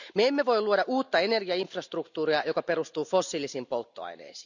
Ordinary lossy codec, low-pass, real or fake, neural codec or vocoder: none; 7.2 kHz; real; none